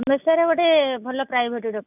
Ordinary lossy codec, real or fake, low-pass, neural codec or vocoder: none; real; 3.6 kHz; none